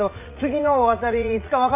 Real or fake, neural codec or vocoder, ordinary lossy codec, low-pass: fake; vocoder, 44.1 kHz, 80 mel bands, Vocos; none; 3.6 kHz